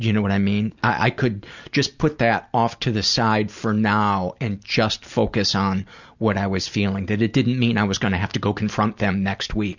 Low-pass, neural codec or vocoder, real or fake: 7.2 kHz; none; real